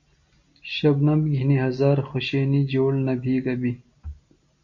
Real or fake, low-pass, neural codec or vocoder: real; 7.2 kHz; none